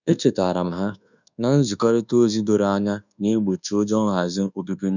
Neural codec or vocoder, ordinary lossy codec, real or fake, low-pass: codec, 24 kHz, 1.2 kbps, DualCodec; none; fake; 7.2 kHz